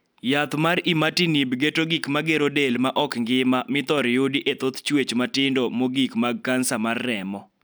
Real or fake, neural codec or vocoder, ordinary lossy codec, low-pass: real; none; none; none